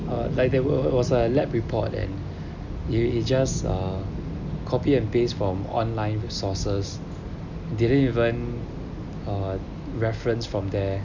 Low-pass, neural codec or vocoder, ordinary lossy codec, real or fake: 7.2 kHz; none; none; real